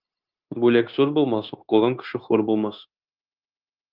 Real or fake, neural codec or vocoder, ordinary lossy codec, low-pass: fake; codec, 16 kHz, 0.9 kbps, LongCat-Audio-Codec; Opus, 24 kbps; 5.4 kHz